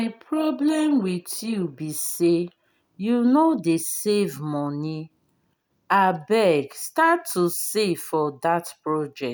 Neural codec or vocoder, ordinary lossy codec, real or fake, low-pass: none; none; real; none